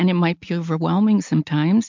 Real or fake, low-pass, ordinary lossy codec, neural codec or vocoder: real; 7.2 kHz; MP3, 64 kbps; none